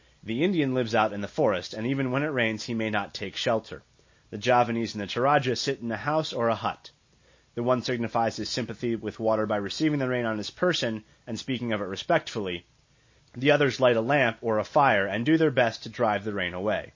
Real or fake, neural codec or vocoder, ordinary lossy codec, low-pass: real; none; MP3, 32 kbps; 7.2 kHz